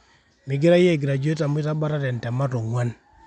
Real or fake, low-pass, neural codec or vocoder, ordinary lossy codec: real; 10.8 kHz; none; none